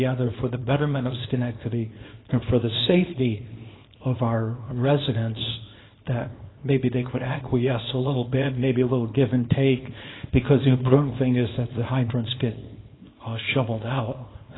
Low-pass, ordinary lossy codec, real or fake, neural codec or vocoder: 7.2 kHz; AAC, 16 kbps; fake; codec, 24 kHz, 0.9 kbps, WavTokenizer, small release